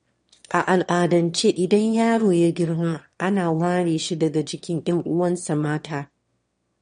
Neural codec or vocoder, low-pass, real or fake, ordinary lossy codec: autoencoder, 22.05 kHz, a latent of 192 numbers a frame, VITS, trained on one speaker; 9.9 kHz; fake; MP3, 48 kbps